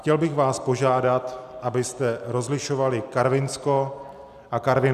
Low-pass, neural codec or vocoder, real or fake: 14.4 kHz; none; real